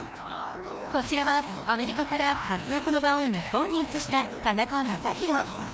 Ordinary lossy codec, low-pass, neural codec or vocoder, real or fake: none; none; codec, 16 kHz, 1 kbps, FreqCodec, larger model; fake